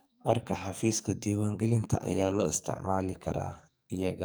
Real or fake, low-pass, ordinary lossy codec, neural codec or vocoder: fake; none; none; codec, 44.1 kHz, 2.6 kbps, SNAC